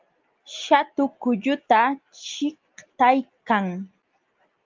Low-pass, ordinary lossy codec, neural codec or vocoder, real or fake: 7.2 kHz; Opus, 24 kbps; none; real